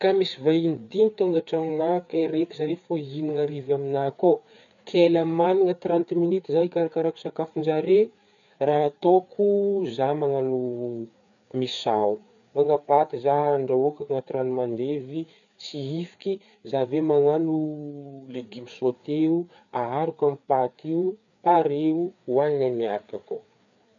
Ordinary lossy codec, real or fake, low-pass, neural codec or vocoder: none; fake; 7.2 kHz; codec, 16 kHz, 4 kbps, FreqCodec, larger model